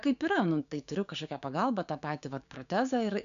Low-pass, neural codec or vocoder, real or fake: 7.2 kHz; codec, 16 kHz, 6 kbps, DAC; fake